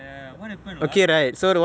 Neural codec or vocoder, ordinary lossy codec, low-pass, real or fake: none; none; none; real